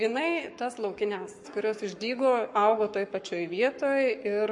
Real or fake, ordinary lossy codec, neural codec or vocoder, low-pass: fake; MP3, 48 kbps; codec, 44.1 kHz, 7.8 kbps, Pupu-Codec; 10.8 kHz